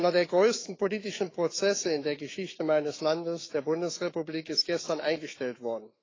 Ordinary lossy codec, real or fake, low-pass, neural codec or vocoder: AAC, 32 kbps; fake; 7.2 kHz; autoencoder, 48 kHz, 128 numbers a frame, DAC-VAE, trained on Japanese speech